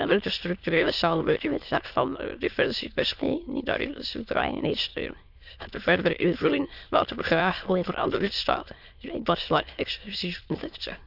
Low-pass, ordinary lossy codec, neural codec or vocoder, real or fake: 5.4 kHz; Opus, 64 kbps; autoencoder, 22.05 kHz, a latent of 192 numbers a frame, VITS, trained on many speakers; fake